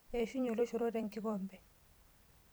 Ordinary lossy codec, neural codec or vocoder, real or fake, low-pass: none; vocoder, 44.1 kHz, 128 mel bands every 256 samples, BigVGAN v2; fake; none